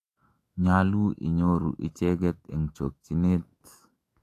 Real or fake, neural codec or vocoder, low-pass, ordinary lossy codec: fake; autoencoder, 48 kHz, 128 numbers a frame, DAC-VAE, trained on Japanese speech; 14.4 kHz; AAC, 48 kbps